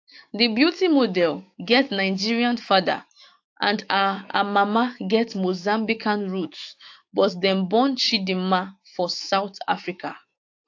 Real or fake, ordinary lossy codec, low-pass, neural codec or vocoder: fake; AAC, 48 kbps; 7.2 kHz; autoencoder, 48 kHz, 128 numbers a frame, DAC-VAE, trained on Japanese speech